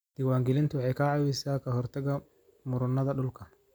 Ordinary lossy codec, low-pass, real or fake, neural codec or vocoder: none; none; real; none